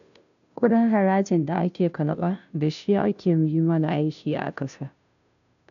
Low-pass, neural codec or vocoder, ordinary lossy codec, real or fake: 7.2 kHz; codec, 16 kHz, 0.5 kbps, FunCodec, trained on Chinese and English, 25 frames a second; none; fake